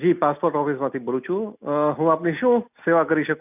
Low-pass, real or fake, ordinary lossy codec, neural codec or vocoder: 3.6 kHz; real; none; none